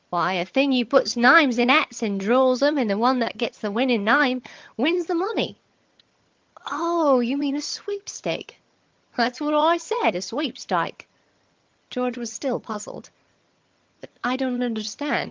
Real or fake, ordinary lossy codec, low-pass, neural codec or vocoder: fake; Opus, 32 kbps; 7.2 kHz; vocoder, 22.05 kHz, 80 mel bands, HiFi-GAN